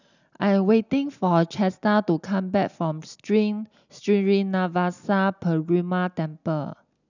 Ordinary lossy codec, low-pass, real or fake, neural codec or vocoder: none; 7.2 kHz; real; none